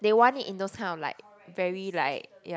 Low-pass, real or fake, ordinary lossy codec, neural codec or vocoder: none; real; none; none